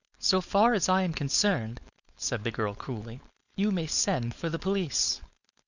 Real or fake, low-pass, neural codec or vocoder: fake; 7.2 kHz; codec, 16 kHz, 4.8 kbps, FACodec